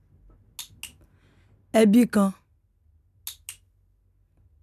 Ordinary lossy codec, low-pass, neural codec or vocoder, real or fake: none; 14.4 kHz; none; real